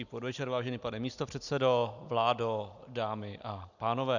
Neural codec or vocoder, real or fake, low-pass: none; real; 7.2 kHz